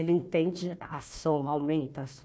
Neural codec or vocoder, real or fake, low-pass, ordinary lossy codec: codec, 16 kHz, 1 kbps, FunCodec, trained on Chinese and English, 50 frames a second; fake; none; none